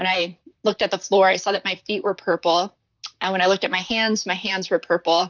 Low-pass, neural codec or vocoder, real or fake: 7.2 kHz; vocoder, 44.1 kHz, 128 mel bands, Pupu-Vocoder; fake